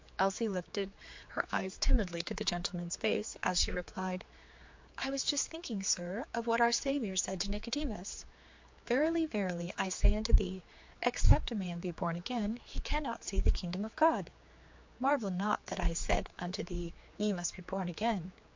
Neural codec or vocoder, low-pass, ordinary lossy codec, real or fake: codec, 16 kHz, 4 kbps, X-Codec, HuBERT features, trained on general audio; 7.2 kHz; MP3, 48 kbps; fake